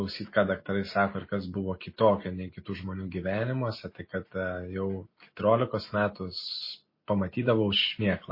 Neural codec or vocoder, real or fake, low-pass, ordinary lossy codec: none; real; 5.4 kHz; MP3, 24 kbps